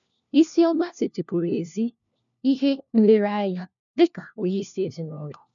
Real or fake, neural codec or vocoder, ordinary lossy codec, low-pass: fake; codec, 16 kHz, 1 kbps, FunCodec, trained on LibriTTS, 50 frames a second; none; 7.2 kHz